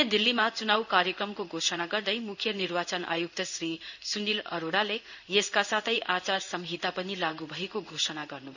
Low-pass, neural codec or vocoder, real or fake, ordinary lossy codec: 7.2 kHz; codec, 16 kHz in and 24 kHz out, 1 kbps, XY-Tokenizer; fake; none